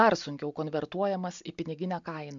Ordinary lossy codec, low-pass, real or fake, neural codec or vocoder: MP3, 64 kbps; 7.2 kHz; real; none